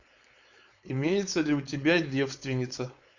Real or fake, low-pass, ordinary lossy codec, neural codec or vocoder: fake; 7.2 kHz; Opus, 64 kbps; codec, 16 kHz, 4.8 kbps, FACodec